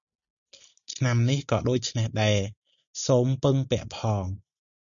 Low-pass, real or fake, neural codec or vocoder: 7.2 kHz; real; none